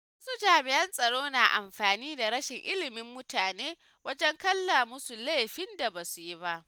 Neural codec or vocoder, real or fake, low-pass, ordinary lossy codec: none; real; none; none